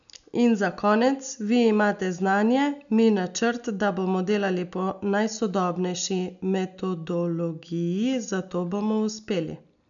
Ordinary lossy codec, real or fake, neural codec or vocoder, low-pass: none; real; none; 7.2 kHz